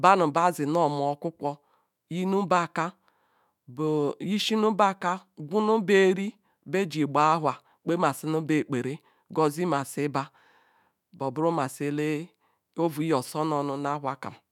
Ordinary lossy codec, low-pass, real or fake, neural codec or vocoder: none; 19.8 kHz; fake; autoencoder, 48 kHz, 128 numbers a frame, DAC-VAE, trained on Japanese speech